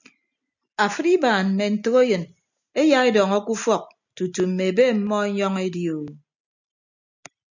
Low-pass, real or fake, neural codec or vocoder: 7.2 kHz; real; none